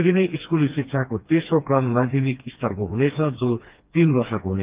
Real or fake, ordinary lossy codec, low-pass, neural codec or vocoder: fake; Opus, 32 kbps; 3.6 kHz; codec, 16 kHz, 2 kbps, FreqCodec, smaller model